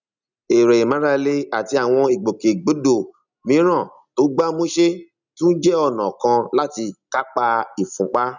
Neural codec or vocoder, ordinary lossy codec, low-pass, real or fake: none; none; 7.2 kHz; real